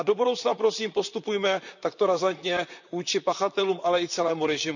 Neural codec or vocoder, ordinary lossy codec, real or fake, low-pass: vocoder, 44.1 kHz, 128 mel bands, Pupu-Vocoder; none; fake; 7.2 kHz